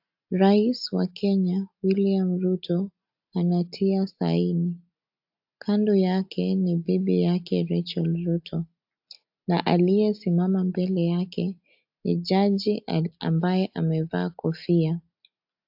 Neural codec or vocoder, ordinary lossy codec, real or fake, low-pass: none; AAC, 48 kbps; real; 5.4 kHz